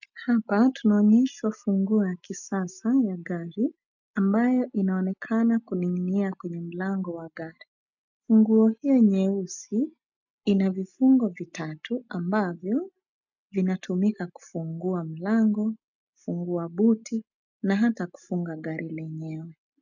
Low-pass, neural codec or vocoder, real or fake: 7.2 kHz; none; real